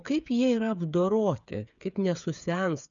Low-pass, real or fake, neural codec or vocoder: 7.2 kHz; fake; codec, 16 kHz, 8 kbps, FreqCodec, smaller model